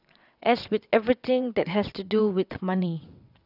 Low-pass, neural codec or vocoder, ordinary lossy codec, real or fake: 5.4 kHz; vocoder, 22.05 kHz, 80 mel bands, WaveNeXt; none; fake